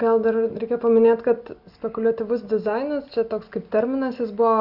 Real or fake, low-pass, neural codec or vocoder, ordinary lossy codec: real; 5.4 kHz; none; Opus, 64 kbps